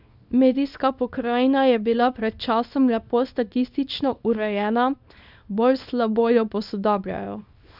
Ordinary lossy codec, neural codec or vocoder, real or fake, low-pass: none; codec, 24 kHz, 0.9 kbps, WavTokenizer, small release; fake; 5.4 kHz